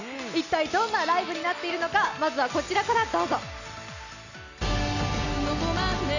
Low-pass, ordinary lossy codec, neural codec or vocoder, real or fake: 7.2 kHz; none; none; real